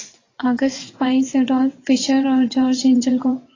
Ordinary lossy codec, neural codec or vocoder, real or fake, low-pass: AAC, 32 kbps; vocoder, 24 kHz, 100 mel bands, Vocos; fake; 7.2 kHz